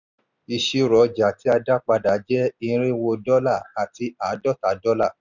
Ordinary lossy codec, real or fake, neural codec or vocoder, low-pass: none; real; none; 7.2 kHz